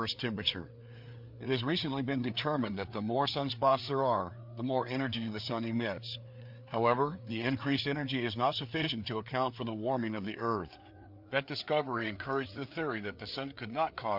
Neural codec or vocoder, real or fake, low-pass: codec, 16 kHz, 4 kbps, FreqCodec, larger model; fake; 5.4 kHz